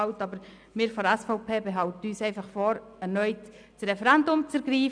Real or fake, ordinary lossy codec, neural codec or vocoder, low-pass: real; none; none; 9.9 kHz